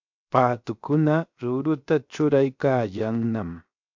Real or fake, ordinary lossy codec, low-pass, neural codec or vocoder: fake; MP3, 64 kbps; 7.2 kHz; codec, 16 kHz, 0.7 kbps, FocalCodec